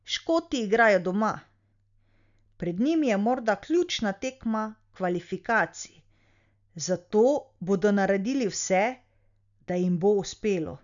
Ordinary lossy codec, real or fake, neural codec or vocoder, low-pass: none; real; none; 7.2 kHz